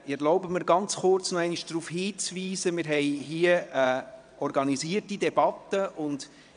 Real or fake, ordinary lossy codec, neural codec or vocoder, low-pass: fake; none; vocoder, 22.05 kHz, 80 mel bands, WaveNeXt; 9.9 kHz